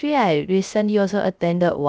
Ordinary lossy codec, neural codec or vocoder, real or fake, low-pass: none; codec, 16 kHz, 0.3 kbps, FocalCodec; fake; none